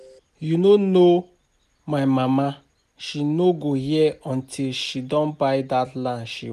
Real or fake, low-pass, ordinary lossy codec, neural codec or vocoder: real; 14.4 kHz; none; none